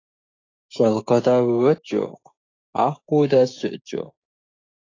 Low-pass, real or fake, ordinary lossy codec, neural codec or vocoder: 7.2 kHz; fake; AAC, 32 kbps; codec, 16 kHz, 6 kbps, DAC